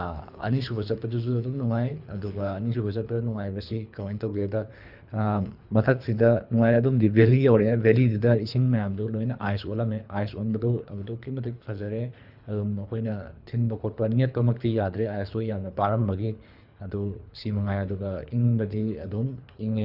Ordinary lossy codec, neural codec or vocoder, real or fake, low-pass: Opus, 64 kbps; codec, 24 kHz, 3 kbps, HILCodec; fake; 5.4 kHz